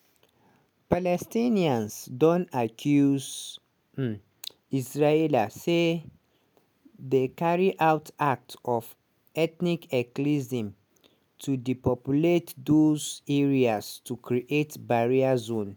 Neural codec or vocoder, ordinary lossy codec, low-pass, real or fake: none; none; none; real